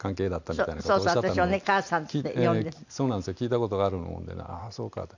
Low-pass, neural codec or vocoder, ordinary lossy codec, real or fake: 7.2 kHz; none; none; real